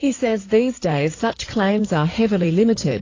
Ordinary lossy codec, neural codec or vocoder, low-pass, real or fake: AAC, 32 kbps; codec, 16 kHz in and 24 kHz out, 2.2 kbps, FireRedTTS-2 codec; 7.2 kHz; fake